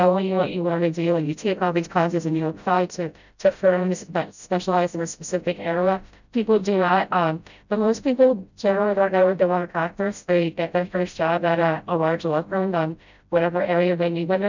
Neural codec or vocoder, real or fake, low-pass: codec, 16 kHz, 0.5 kbps, FreqCodec, smaller model; fake; 7.2 kHz